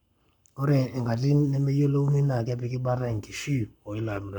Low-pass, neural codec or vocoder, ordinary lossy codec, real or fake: 19.8 kHz; codec, 44.1 kHz, 7.8 kbps, Pupu-Codec; none; fake